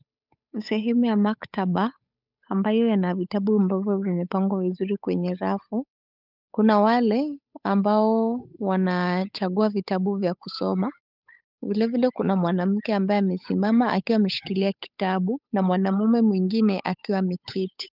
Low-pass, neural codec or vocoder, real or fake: 5.4 kHz; codec, 16 kHz, 8 kbps, FunCodec, trained on Chinese and English, 25 frames a second; fake